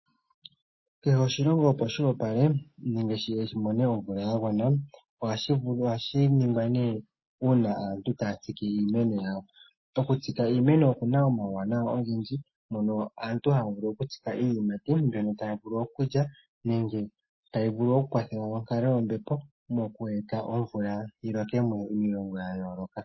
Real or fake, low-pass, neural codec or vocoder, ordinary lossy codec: real; 7.2 kHz; none; MP3, 24 kbps